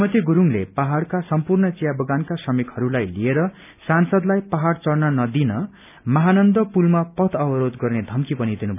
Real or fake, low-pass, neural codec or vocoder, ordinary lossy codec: real; 3.6 kHz; none; none